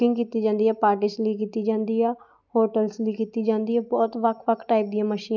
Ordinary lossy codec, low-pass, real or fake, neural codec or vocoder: none; 7.2 kHz; real; none